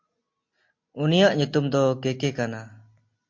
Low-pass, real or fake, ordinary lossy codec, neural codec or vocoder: 7.2 kHz; real; MP3, 48 kbps; none